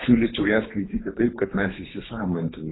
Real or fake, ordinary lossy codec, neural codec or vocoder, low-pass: fake; AAC, 16 kbps; codec, 24 kHz, 3 kbps, HILCodec; 7.2 kHz